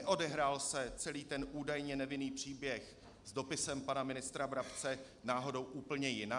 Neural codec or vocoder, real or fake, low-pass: none; real; 10.8 kHz